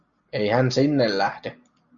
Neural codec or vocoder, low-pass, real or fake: none; 7.2 kHz; real